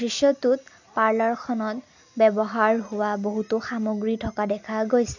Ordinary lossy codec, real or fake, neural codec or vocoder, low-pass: none; real; none; 7.2 kHz